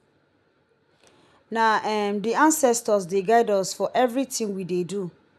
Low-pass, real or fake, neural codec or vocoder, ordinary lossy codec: none; real; none; none